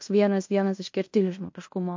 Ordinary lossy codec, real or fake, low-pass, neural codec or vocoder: MP3, 48 kbps; fake; 7.2 kHz; codec, 16 kHz in and 24 kHz out, 0.9 kbps, LongCat-Audio-Codec, fine tuned four codebook decoder